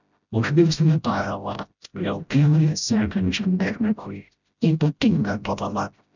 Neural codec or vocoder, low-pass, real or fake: codec, 16 kHz, 0.5 kbps, FreqCodec, smaller model; 7.2 kHz; fake